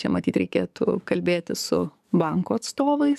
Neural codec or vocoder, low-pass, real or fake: codec, 44.1 kHz, 7.8 kbps, DAC; 14.4 kHz; fake